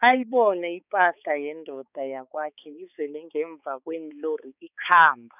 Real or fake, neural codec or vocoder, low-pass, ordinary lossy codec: fake; codec, 16 kHz, 4 kbps, X-Codec, HuBERT features, trained on balanced general audio; 3.6 kHz; none